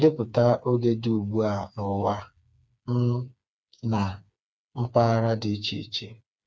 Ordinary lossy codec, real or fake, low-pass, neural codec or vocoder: none; fake; none; codec, 16 kHz, 4 kbps, FreqCodec, smaller model